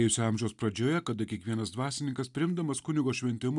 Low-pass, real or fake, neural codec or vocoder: 10.8 kHz; real; none